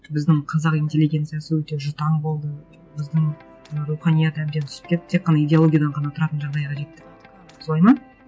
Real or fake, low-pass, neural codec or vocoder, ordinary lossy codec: real; none; none; none